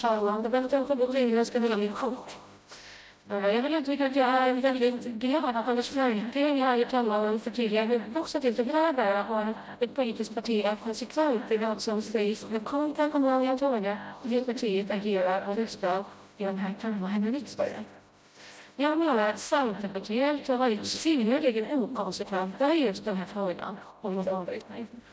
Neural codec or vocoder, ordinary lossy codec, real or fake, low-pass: codec, 16 kHz, 0.5 kbps, FreqCodec, smaller model; none; fake; none